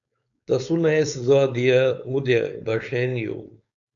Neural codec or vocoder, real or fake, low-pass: codec, 16 kHz, 4.8 kbps, FACodec; fake; 7.2 kHz